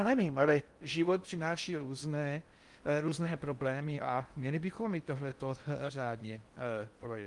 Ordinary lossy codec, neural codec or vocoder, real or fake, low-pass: Opus, 32 kbps; codec, 16 kHz in and 24 kHz out, 0.6 kbps, FocalCodec, streaming, 4096 codes; fake; 10.8 kHz